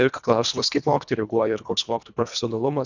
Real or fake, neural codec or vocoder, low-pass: fake; codec, 24 kHz, 1.5 kbps, HILCodec; 7.2 kHz